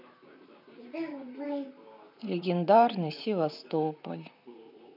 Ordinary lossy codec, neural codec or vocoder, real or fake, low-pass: none; vocoder, 44.1 kHz, 128 mel bands, Pupu-Vocoder; fake; 5.4 kHz